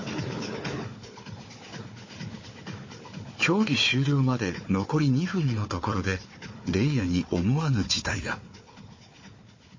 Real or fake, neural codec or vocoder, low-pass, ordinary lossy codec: fake; codec, 16 kHz, 4 kbps, FunCodec, trained on Chinese and English, 50 frames a second; 7.2 kHz; MP3, 32 kbps